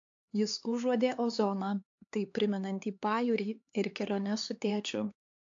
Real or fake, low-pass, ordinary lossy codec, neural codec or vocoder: fake; 7.2 kHz; AAC, 48 kbps; codec, 16 kHz, 4 kbps, X-Codec, HuBERT features, trained on LibriSpeech